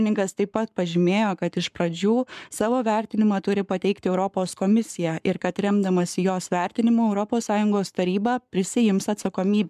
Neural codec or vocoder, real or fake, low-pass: codec, 44.1 kHz, 7.8 kbps, Pupu-Codec; fake; 14.4 kHz